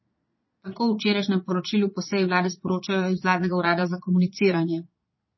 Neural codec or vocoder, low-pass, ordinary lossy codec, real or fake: vocoder, 22.05 kHz, 80 mel bands, Vocos; 7.2 kHz; MP3, 24 kbps; fake